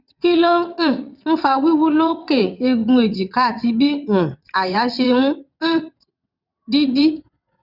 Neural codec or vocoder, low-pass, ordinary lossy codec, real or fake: vocoder, 22.05 kHz, 80 mel bands, WaveNeXt; 5.4 kHz; none; fake